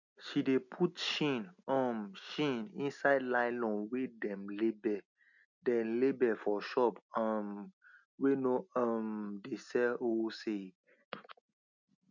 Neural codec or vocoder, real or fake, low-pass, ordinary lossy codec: none; real; 7.2 kHz; none